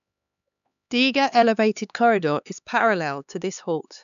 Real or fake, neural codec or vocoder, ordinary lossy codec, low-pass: fake; codec, 16 kHz, 4 kbps, X-Codec, HuBERT features, trained on LibriSpeech; none; 7.2 kHz